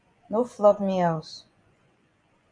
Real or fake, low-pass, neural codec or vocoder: fake; 9.9 kHz; vocoder, 24 kHz, 100 mel bands, Vocos